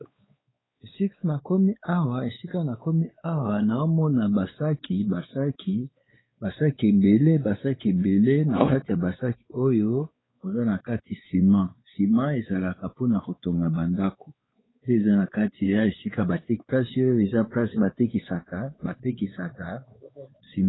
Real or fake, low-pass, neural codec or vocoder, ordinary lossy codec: fake; 7.2 kHz; codec, 16 kHz, 4 kbps, X-Codec, WavLM features, trained on Multilingual LibriSpeech; AAC, 16 kbps